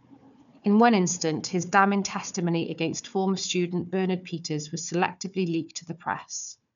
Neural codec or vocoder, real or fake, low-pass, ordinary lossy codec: codec, 16 kHz, 4 kbps, FunCodec, trained on Chinese and English, 50 frames a second; fake; 7.2 kHz; none